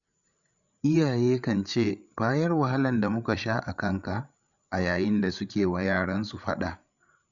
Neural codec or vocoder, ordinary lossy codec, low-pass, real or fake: codec, 16 kHz, 8 kbps, FreqCodec, larger model; none; 7.2 kHz; fake